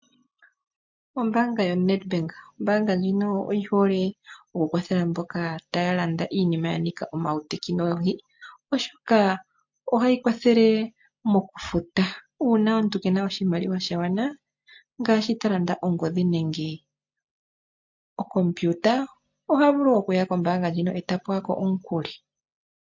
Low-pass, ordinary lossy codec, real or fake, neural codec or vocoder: 7.2 kHz; MP3, 48 kbps; real; none